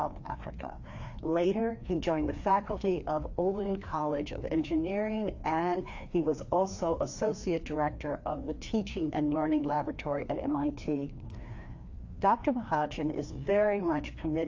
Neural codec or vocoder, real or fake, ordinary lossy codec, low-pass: codec, 16 kHz, 2 kbps, FreqCodec, larger model; fake; AAC, 48 kbps; 7.2 kHz